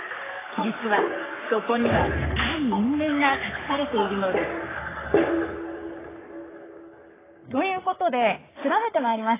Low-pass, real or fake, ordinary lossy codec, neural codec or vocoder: 3.6 kHz; fake; AAC, 16 kbps; codec, 44.1 kHz, 3.4 kbps, Pupu-Codec